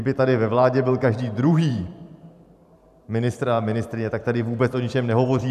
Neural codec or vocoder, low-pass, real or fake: vocoder, 44.1 kHz, 128 mel bands every 512 samples, BigVGAN v2; 14.4 kHz; fake